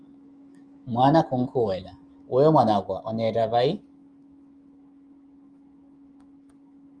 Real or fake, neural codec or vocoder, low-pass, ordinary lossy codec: fake; autoencoder, 48 kHz, 128 numbers a frame, DAC-VAE, trained on Japanese speech; 9.9 kHz; Opus, 32 kbps